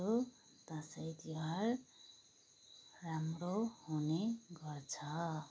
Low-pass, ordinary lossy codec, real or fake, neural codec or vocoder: none; none; real; none